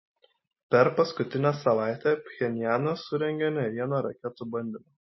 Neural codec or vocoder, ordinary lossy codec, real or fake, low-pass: none; MP3, 24 kbps; real; 7.2 kHz